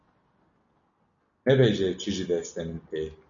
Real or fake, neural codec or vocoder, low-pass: real; none; 7.2 kHz